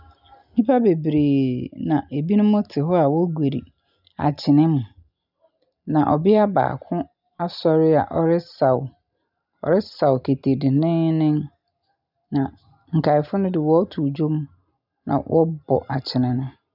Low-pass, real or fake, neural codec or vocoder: 5.4 kHz; real; none